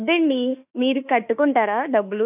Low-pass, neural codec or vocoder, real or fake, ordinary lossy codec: 3.6 kHz; autoencoder, 48 kHz, 32 numbers a frame, DAC-VAE, trained on Japanese speech; fake; none